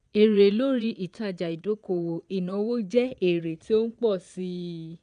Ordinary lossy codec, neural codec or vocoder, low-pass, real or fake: none; vocoder, 22.05 kHz, 80 mel bands, Vocos; 9.9 kHz; fake